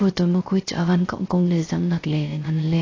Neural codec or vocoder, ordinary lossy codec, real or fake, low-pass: codec, 16 kHz, 0.3 kbps, FocalCodec; AAC, 32 kbps; fake; 7.2 kHz